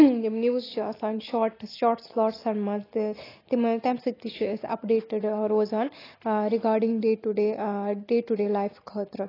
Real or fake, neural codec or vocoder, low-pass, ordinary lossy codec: real; none; 5.4 kHz; AAC, 24 kbps